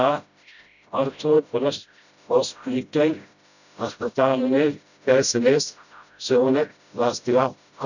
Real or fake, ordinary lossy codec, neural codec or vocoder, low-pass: fake; none; codec, 16 kHz, 0.5 kbps, FreqCodec, smaller model; 7.2 kHz